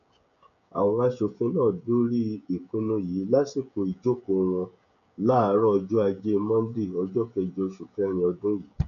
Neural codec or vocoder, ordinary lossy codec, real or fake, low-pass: codec, 16 kHz, 16 kbps, FreqCodec, smaller model; none; fake; 7.2 kHz